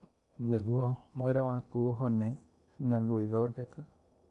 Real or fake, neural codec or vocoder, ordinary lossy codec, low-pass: fake; codec, 16 kHz in and 24 kHz out, 0.8 kbps, FocalCodec, streaming, 65536 codes; none; 10.8 kHz